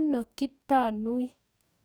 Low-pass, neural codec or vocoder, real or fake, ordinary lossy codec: none; codec, 44.1 kHz, 2.6 kbps, DAC; fake; none